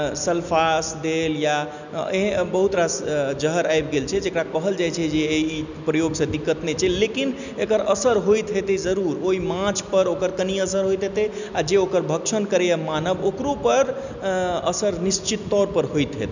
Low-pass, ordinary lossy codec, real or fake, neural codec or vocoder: 7.2 kHz; none; real; none